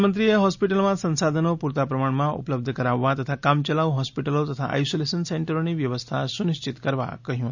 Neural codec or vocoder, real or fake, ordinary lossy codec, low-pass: none; real; none; 7.2 kHz